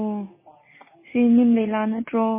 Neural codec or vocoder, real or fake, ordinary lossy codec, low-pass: none; real; MP3, 16 kbps; 3.6 kHz